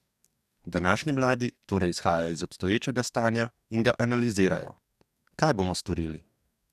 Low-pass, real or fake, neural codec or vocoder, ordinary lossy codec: 14.4 kHz; fake; codec, 44.1 kHz, 2.6 kbps, DAC; none